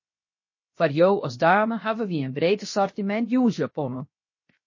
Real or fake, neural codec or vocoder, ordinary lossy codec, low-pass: fake; codec, 16 kHz, 0.7 kbps, FocalCodec; MP3, 32 kbps; 7.2 kHz